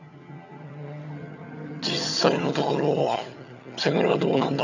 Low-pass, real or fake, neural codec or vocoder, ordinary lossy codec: 7.2 kHz; fake; vocoder, 22.05 kHz, 80 mel bands, HiFi-GAN; none